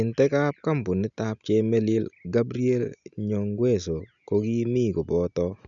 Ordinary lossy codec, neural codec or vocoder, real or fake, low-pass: none; none; real; 7.2 kHz